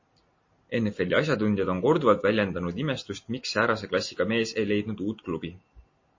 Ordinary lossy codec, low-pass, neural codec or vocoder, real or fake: MP3, 32 kbps; 7.2 kHz; none; real